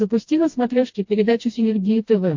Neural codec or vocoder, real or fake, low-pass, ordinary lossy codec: codec, 16 kHz, 1 kbps, FreqCodec, smaller model; fake; 7.2 kHz; MP3, 48 kbps